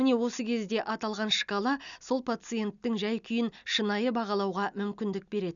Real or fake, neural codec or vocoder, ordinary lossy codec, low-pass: real; none; none; 7.2 kHz